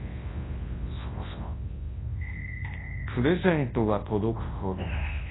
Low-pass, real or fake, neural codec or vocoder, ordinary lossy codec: 7.2 kHz; fake; codec, 24 kHz, 0.9 kbps, WavTokenizer, large speech release; AAC, 16 kbps